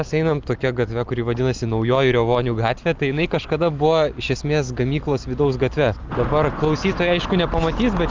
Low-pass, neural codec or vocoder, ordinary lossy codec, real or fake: 7.2 kHz; none; Opus, 24 kbps; real